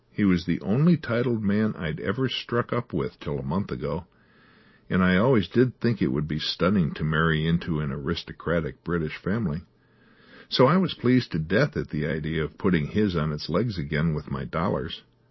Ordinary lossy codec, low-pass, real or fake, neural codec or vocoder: MP3, 24 kbps; 7.2 kHz; real; none